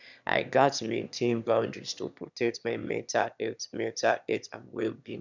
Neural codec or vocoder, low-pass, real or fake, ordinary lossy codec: autoencoder, 22.05 kHz, a latent of 192 numbers a frame, VITS, trained on one speaker; 7.2 kHz; fake; none